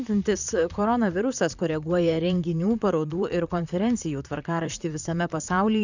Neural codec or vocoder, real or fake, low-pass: vocoder, 44.1 kHz, 128 mel bands, Pupu-Vocoder; fake; 7.2 kHz